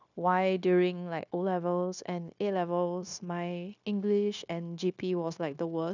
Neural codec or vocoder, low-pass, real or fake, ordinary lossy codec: codec, 16 kHz, 0.9 kbps, LongCat-Audio-Codec; 7.2 kHz; fake; none